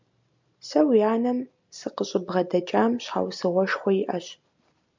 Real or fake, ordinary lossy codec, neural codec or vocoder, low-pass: real; MP3, 64 kbps; none; 7.2 kHz